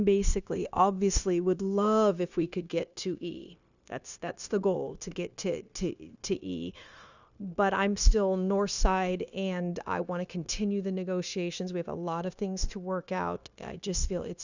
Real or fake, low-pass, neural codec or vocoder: fake; 7.2 kHz; codec, 16 kHz, 0.9 kbps, LongCat-Audio-Codec